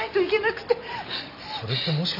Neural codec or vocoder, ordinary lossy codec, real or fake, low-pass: none; none; real; 5.4 kHz